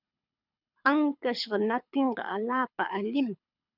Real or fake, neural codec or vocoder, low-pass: fake; codec, 24 kHz, 6 kbps, HILCodec; 5.4 kHz